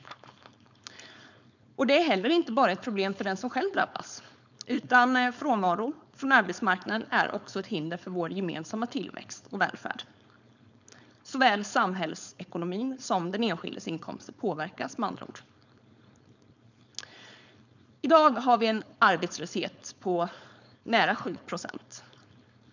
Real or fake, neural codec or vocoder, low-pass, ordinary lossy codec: fake; codec, 16 kHz, 4.8 kbps, FACodec; 7.2 kHz; none